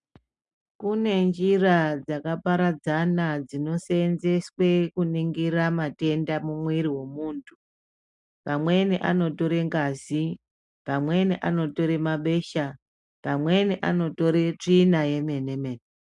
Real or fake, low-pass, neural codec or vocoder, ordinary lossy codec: real; 10.8 kHz; none; MP3, 96 kbps